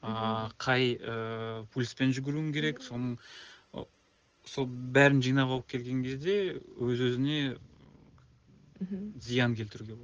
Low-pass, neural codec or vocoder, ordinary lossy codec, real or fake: 7.2 kHz; none; Opus, 16 kbps; real